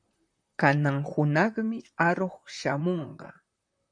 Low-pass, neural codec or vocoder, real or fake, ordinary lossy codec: 9.9 kHz; vocoder, 44.1 kHz, 128 mel bands, Pupu-Vocoder; fake; MP3, 64 kbps